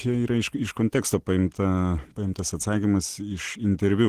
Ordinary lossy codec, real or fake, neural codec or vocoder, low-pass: Opus, 16 kbps; real; none; 14.4 kHz